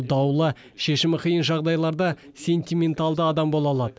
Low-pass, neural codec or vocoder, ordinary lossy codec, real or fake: none; none; none; real